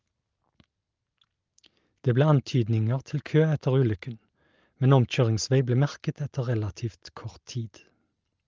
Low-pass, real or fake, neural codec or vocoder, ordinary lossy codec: 7.2 kHz; real; none; Opus, 24 kbps